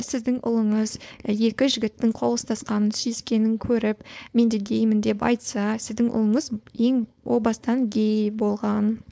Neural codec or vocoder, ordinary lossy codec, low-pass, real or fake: codec, 16 kHz, 4.8 kbps, FACodec; none; none; fake